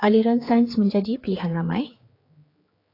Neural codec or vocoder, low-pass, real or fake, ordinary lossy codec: codec, 16 kHz, 4 kbps, X-Codec, HuBERT features, trained on general audio; 5.4 kHz; fake; AAC, 24 kbps